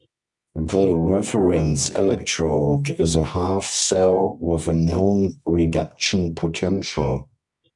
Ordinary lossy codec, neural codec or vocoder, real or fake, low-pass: MP3, 64 kbps; codec, 24 kHz, 0.9 kbps, WavTokenizer, medium music audio release; fake; 10.8 kHz